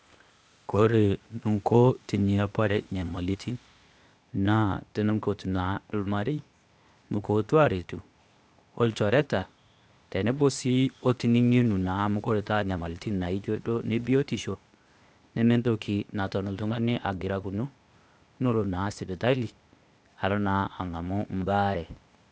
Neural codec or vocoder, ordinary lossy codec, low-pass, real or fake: codec, 16 kHz, 0.8 kbps, ZipCodec; none; none; fake